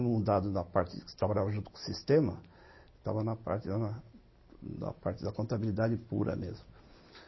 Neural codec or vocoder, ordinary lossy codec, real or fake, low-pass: none; MP3, 24 kbps; real; 7.2 kHz